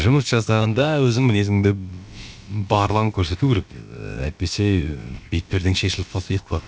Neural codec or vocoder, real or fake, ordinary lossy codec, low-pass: codec, 16 kHz, about 1 kbps, DyCAST, with the encoder's durations; fake; none; none